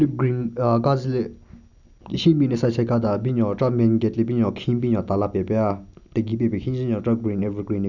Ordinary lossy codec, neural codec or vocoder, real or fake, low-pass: none; none; real; 7.2 kHz